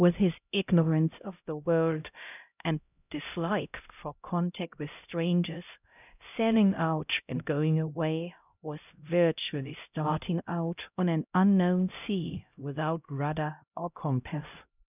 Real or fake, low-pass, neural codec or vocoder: fake; 3.6 kHz; codec, 16 kHz, 0.5 kbps, X-Codec, HuBERT features, trained on LibriSpeech